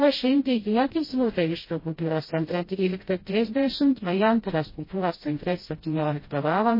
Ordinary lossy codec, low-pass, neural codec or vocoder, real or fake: MP3, 24 kbps; 5.4 kHz; codec, 16 kHz, 0.5 kbps, FreqCodec, smaller model; fake